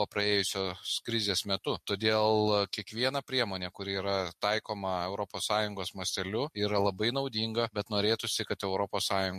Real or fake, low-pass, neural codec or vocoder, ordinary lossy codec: real; 14.4 kHz; none; MP3, 64 kbps